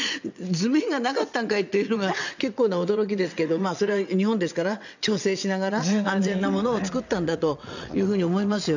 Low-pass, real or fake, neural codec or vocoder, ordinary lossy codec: 7.2 kHz; fake; vocoder, 22.05 kHz, 80 mel bands, WaveNeXt; none